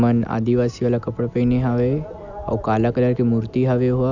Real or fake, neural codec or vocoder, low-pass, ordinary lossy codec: real; none; 7.2 kHz; none